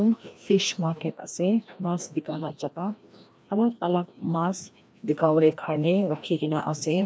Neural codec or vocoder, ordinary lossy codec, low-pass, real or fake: codec, 16 kHz, 1 kbps, FreqCodec, larger model; none; none; fake